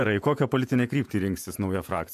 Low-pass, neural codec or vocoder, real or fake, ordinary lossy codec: 14.4 kHz; vocoder, 48 kHz, 128 mel bands, Vocos; fake; MP3, 96 kbps